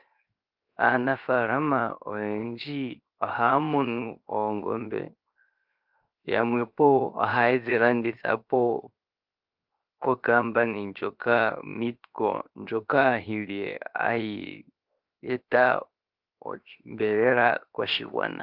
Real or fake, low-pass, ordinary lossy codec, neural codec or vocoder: fake; 5.4 kHz; Opus, 24 kbps; codec, 16 kHz, 0.7 kbps, FocalCodec